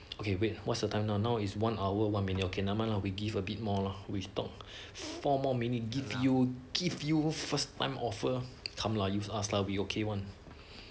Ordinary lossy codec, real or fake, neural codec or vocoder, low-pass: none; real; none; none